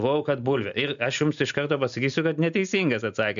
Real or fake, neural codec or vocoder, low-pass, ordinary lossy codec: real; none; 7.2 kHz; AAC, 96 kbps